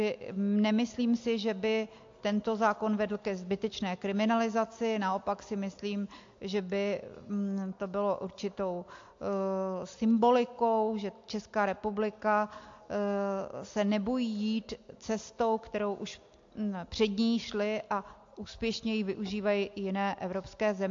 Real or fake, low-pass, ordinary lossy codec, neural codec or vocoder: real; 7.2 kHz; AAC, 48 kbps; none